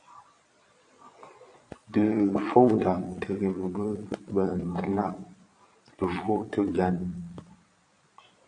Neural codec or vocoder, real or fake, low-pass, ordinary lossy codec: vocoder, 22.05 kHz, 80 mel bands, Vocos; fake; 9.9 kHz; AAC, 48 kbps